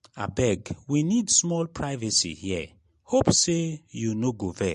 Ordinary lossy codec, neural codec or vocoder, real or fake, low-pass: MP3, 48 kbps; none; real; 14.4 kHz